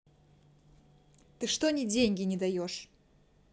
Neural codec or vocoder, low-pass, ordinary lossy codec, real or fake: none; none; none; real